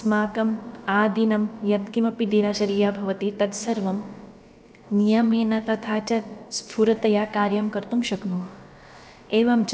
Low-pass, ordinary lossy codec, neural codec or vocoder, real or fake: none; none; codec, 16 kHz, about 1 kbps, DyCAST, with the encoder's durations; fake